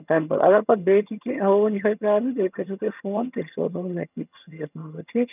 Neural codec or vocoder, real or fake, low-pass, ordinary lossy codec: vocoder, 22.05 kHz, 80 mel bands, HiFi-GAN; fake; 3.6 kHz; none